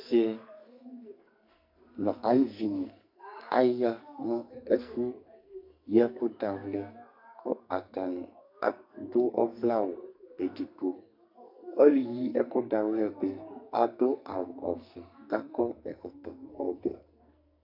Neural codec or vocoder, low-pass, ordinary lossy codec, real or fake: codec, 44.1 kHz, 2.6 kbps, SNAC; 5.4 kHz; MP3, 48 kbps; fake